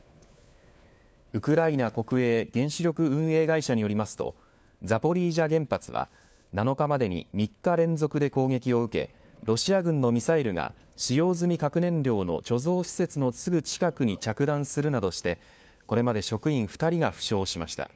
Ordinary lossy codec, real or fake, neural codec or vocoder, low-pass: none; fake; codec, 16 kHz, 4 kbps, FunCodec, trained on LibriTTS, 50 frames a second; none